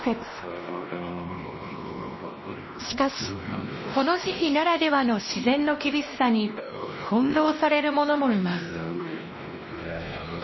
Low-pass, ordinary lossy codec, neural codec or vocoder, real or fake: 7.2 kHz; MP3, 24 kbps; codec, 16 kHz, 1 kbps, X-Codec, WavLM features, trained on Multilingual LibriSpeech; fake